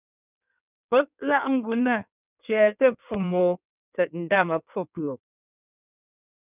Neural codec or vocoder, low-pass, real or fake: codec, 16 kHz in and 24 kHz out, 1.1 kbps, FireRedTTS-2 codec; 3.6 kHz; fake